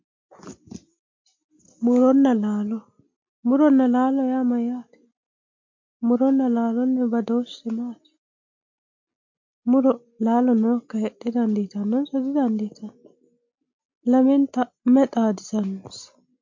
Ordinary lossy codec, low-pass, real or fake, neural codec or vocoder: MP3, 48 kbps; 7.2 kHz; real; none